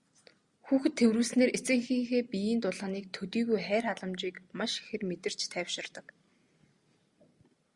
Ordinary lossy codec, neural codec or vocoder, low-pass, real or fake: Opus, 64 kbps; none; 10.8 kHz; real